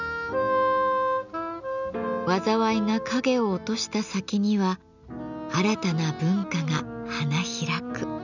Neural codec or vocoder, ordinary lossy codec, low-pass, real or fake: none; none; 7.2 kHz; real